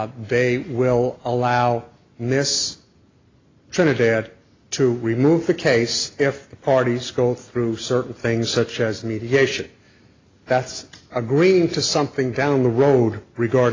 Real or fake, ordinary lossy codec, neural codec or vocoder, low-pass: real; AAC, 32 kbps; none; 7.2 kHz